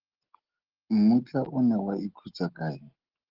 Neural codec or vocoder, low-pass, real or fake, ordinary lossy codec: none; 5.4 kHz; real; Opus, 16 kbps